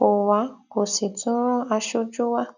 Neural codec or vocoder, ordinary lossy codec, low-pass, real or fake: none; none; 7.2 kHz; real